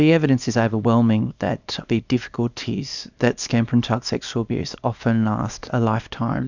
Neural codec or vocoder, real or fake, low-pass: codec, 24 kHz, 0.9 kbps, WavTokenizer, small release; fake; 7.2 kHz